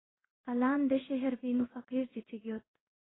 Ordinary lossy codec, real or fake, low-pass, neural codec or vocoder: AAC, 16 kbps; fake; 7.2 kHz; codec, 16 kHz in and 24 kHz out, 1 kbps, XY-Tokenizer